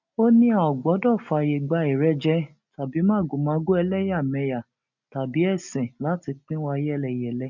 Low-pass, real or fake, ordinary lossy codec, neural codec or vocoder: 7.2 kHz; real; none; none